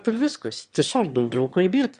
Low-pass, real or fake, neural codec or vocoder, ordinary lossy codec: 9.9 kHz; fake; autoencoder, 22.05 kHz, a latent of 192 numbers a frame, VITS, trained on one speaker; Opus, 64 kbps